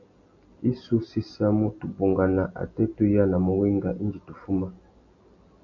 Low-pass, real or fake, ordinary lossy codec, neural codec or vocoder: 7.2 kHz; real; MP3, 48 kbps; none